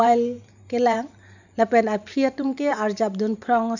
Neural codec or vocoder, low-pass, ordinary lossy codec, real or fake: vocoder, 44.1 kHz, 128 mel bands every 512 samples, BigVGAN v2; 7.2 kHz; none; fake